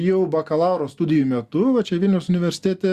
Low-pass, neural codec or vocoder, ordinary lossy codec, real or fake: 14.4 kHz; none; Opus, 64 kbps; real